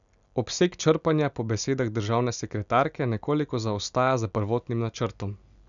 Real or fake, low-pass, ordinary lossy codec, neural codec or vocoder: real; 7.2 kHz; none; none